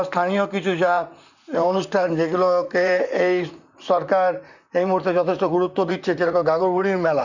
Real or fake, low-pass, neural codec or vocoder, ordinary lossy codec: fake; 7.2 kHz; vocoder, 44.1 kHz, 128 mel bands, Pupu-Vocoder; none